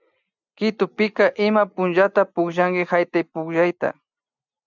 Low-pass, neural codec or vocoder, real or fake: 7.2 kHz; none; real